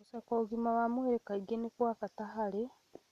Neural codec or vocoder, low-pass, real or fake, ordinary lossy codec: none; 14.4 kHz; real; none